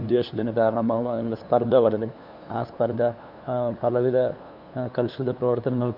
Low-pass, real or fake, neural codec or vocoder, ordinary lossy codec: 5.4 kHz; fake; codec, 16 kHz, 2 kbps, FunCodec, trained on LibriTTS, 25 frames a second; none